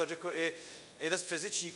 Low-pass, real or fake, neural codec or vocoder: 10.8 kHz; fake; codec, 24 kHz, 0.5 kbps, DualCodec